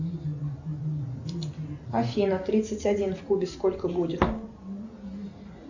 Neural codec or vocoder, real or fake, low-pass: none; real; 7.2 kHz